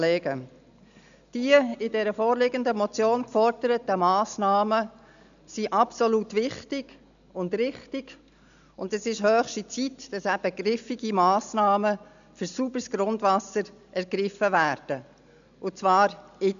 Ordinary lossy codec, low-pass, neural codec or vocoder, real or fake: none; 7.2 kHz; none; real